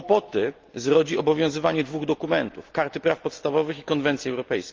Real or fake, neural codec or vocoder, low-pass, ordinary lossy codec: real; none; 7.2 kHz; Opus, 32 kbps